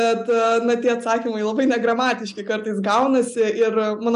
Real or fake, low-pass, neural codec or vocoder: real; 10.8 kHz; none